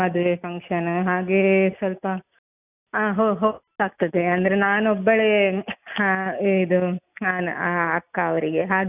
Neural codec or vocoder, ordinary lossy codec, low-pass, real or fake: none; none; 3.6 kHz; real